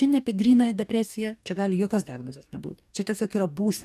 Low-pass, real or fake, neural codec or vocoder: 14.4 kHz; fake; codec, 44.1 kHz, 2.6 kbps, DAC